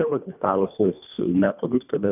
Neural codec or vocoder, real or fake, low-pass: codec, 24 kHz, 1.5 kbps, HILCodec; fake; 3.6 kHz